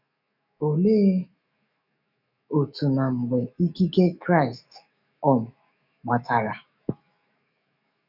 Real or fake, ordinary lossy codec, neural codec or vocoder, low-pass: fake; none; autoencoder, 48 kHz, 128 numbers a frame, DAC-VAE, trained on Japanese speech; 5.4 kHz